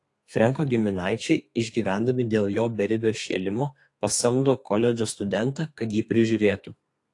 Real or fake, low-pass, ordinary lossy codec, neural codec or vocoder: fake; 10.8 kHz; AAC, 48 kbps; codec, 32 kHz, 1.9 kbps, SNAC